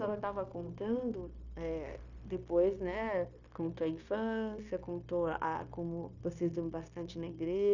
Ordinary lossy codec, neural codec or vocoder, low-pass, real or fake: none; codec, 16 kHz, 0.9 kbps, LongCat-Audio-Codec; 7.2 kHz; fake